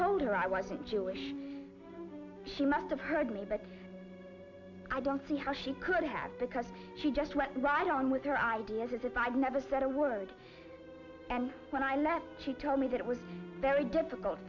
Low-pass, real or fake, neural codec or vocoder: 7.2 kHz; real; none